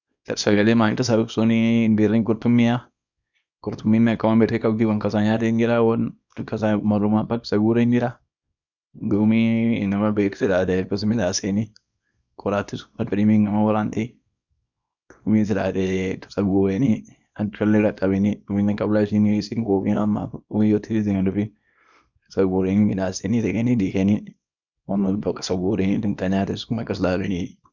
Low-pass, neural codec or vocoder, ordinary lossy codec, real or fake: 7.2 kHz; codec, 24 kHz, 0.9 kbps, WavTokenizer, small release; none; fake